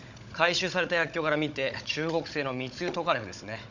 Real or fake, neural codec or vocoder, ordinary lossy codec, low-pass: fake; codec, 16 kHz, 16 kbps, FunCodec, trained on Chinese and English, 50 frames a second; none; 7.2 kHz